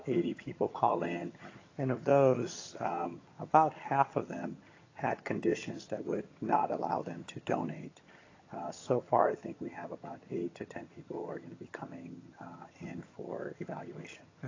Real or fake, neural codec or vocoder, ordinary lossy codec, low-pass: fake; vocoder, 22.05 kHz, 80 mel bands, HiFi-GAN; AAC, 32 kbps; 7.2 kHz